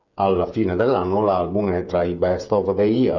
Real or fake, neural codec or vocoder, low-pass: fake; codec, 16 kHz, 8 kbps, FreqCodec, smaller model; 7.2 kHz